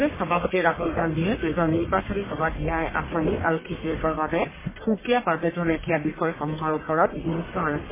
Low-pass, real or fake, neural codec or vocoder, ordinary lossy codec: 3.6 kHz; fake; codec, 44.1 kHz, 1.7 kbps, Pupu-Codec; MP3, 16 kbps